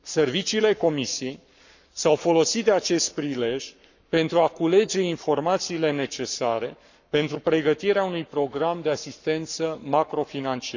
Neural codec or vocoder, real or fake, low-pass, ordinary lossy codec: codec, 44.1 kHz, 7.8 kbps, Pupu-Codec; fake; 7.2 kHz; none